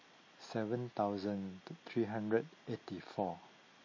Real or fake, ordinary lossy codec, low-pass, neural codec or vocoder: real; MP3, 32 kbps; 7.2 kHz; none